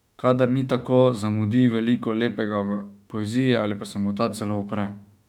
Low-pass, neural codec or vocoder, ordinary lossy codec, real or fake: 19.8 kHz; autoencoder, 48 kHz, 32 numbers a frame, DAC-VAE, trained on Japanese speech; none; fake